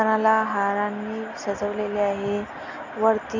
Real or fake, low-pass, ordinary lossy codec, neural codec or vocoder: real; 7.2 kHz; none; none